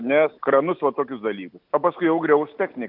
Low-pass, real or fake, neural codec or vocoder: 5.4 kHz; real; none